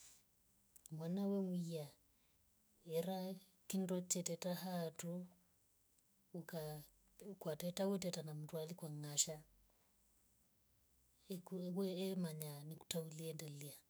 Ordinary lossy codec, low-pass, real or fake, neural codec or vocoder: none; none; fake; autoencoder, 48 kHz, 128 numbers a frame, DAC-VAE, trained on Japanese speech